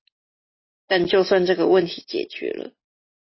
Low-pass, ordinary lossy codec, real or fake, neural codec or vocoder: 7.2 kHz; MP3, 24 kbps; real; none